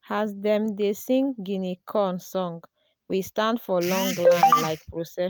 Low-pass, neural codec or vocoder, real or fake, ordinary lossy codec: none; none; real; none